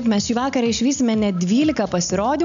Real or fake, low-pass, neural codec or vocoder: real; 7.2 kHz; none